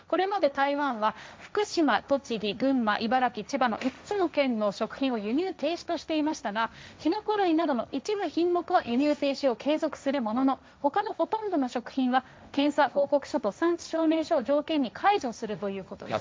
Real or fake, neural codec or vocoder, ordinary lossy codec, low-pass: fake; codec, 16 kHz, 1.1 kbps, Voila-Tokenizer; none; none